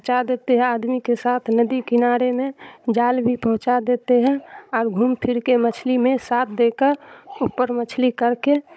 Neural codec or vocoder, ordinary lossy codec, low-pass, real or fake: codec, 16 kHz, 16 kbps, FunCodec, trained on Chinese and English, 50 frames a second; none; none; fake